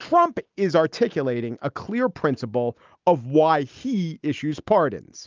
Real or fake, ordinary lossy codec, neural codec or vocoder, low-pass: real; Opus, 24 kbps; none; 7.2 kHz